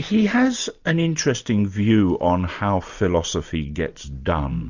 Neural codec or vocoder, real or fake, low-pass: none; real; 7.2 kHz